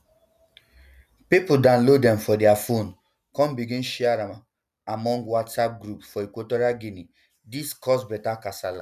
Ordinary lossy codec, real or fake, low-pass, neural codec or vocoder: none; real; 14.4 kHz; none